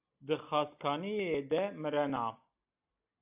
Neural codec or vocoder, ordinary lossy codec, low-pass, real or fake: none; AAC, 32 kbps; 3.6 kHz; real